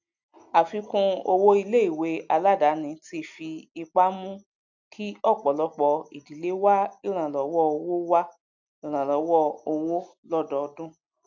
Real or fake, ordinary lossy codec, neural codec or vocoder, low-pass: real; none; none; 7.2 kHz